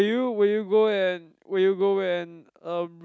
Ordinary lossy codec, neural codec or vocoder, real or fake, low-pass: none; none; real; none